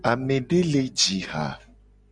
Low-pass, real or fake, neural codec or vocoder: 9.9 kHz; real; none